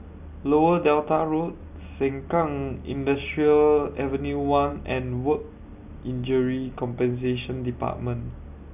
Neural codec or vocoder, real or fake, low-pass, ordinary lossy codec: none; real; 3.6 kHz; none